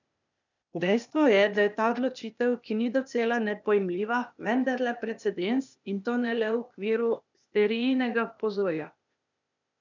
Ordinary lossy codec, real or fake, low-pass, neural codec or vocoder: none; fake; 7.2 kHz; codec, 16 kHz, 0.8 kbps, ZipCodec